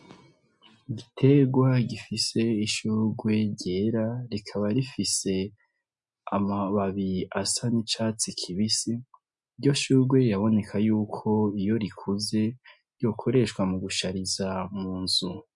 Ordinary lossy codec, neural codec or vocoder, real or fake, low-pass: MP3, 64 kbps; none; real; 10.8 kHz